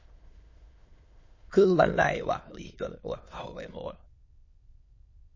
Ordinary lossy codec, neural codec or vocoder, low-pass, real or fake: MP3, 32 kbps; autoencoder, 22.05 kHz, a latent of 192 numbers a frame, VITS, trained on many speakers; 7.2 kHz; fake